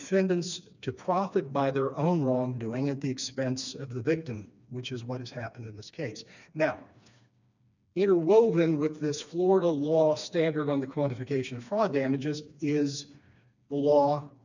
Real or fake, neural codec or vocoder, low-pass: fake; codec, 16 kHz, 2 kbps, FreqCodec, smaller model; 7.2 kHz